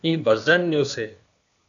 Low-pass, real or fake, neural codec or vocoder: 7.2 kHz; fake; codec, 16 kHz, 0.8 kbps, ZipCodec